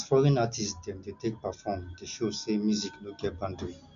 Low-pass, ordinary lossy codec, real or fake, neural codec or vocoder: 7.2 kHz; MP3, 96 kbps; real; none